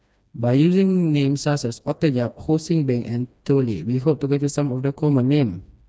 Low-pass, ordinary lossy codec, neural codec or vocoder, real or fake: none; none; codec, 16 kHz, 2 kbps, FreqCodec, smaller model; fake